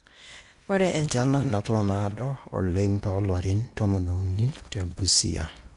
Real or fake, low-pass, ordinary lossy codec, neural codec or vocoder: fake; 10.8 kHz; none; codec, 16 kHz in and 24 kHz out, 0.8 kbps, FocalCodec, streaming, 65536 codes